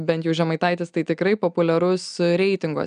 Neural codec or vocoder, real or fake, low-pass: none; real; 9.9 kHz